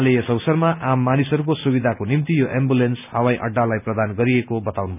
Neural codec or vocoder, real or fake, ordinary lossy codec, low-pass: none; real; none; 3.6 kHz